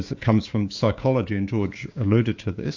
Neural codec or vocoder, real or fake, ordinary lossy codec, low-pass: codec, 16 kHz, 6 kbps, DAC; fake; AAC, 48 kbps; 7.2 kHz